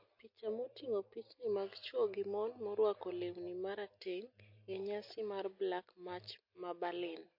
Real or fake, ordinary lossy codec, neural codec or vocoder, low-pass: real; MP3, 32 kbps; none; 5.4 kHz